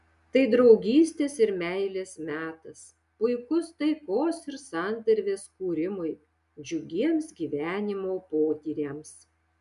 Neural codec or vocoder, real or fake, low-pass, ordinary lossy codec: none; real; 10.8 kHz; MP3, 96 kbps